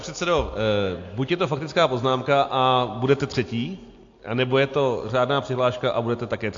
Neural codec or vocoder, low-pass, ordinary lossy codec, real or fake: none; 7.2 kHz; AAC, 48 kbps; real